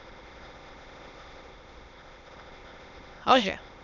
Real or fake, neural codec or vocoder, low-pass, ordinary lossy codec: fake; autoencoder, 22.05 kHz, a latent of 192 numbers a frame, VITS, trained on many speakers; 7.2 kHz; none